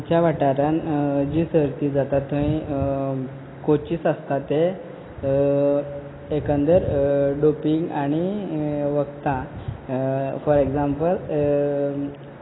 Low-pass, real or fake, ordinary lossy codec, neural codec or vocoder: 7.2 kHz; real; AAC, 16 kbps; none